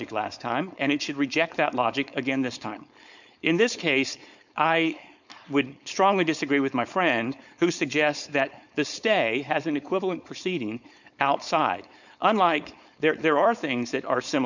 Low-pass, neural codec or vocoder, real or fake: 7.2 kHz; codec, 16 kHz, 4.8 kbps, FACodec; fake